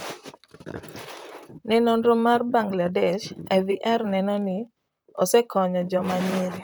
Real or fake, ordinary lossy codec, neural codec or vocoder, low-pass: fake; none; vocoder, 44.1 kHz, 128 mel bands, Pupu-Vocoder; none